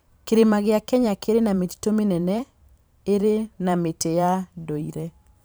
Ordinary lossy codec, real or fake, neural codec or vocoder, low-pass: none; fake; vocoder, 44.1 kHz, 128 mel bands every 512 samples, BigVGAN v2; none